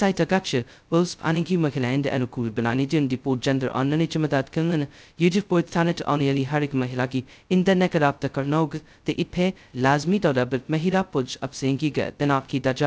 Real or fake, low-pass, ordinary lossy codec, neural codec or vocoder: fake; none; none; codec, 16 kHz, 0.2 kbps, FocalCodec